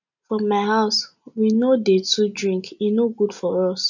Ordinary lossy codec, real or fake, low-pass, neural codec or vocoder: none; real; 7.2 kHz; none